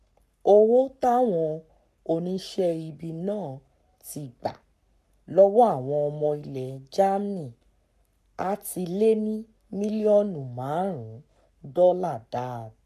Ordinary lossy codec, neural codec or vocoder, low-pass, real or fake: none; codec, 44.1 kHz, 7.8 kbps, Pupu-Codec; 14.4 kHz; fake